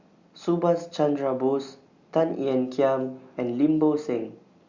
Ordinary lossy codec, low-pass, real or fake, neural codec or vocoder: Opus, 64 kbps; 7.2 kHz; real; none